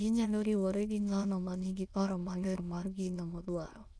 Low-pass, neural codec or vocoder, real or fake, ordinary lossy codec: none; autoencoder, 22.05 kHz, a latent of 192 numbers a frame, VITS, trained on many speakers; fake; none